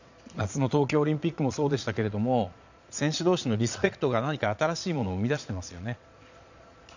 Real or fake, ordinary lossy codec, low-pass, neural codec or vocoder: fake; none; 7.2 kHz; vocoder, 22.05 kHz, 80 mel bands, Vocos